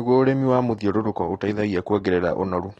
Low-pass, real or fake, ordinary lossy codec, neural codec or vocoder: 19.8 kHz; real; AAC, 32 kbps; none